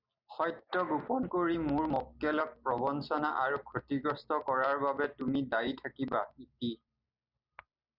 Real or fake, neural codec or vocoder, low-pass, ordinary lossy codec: real; none; 5.4 kHz; Opus, 64 kbps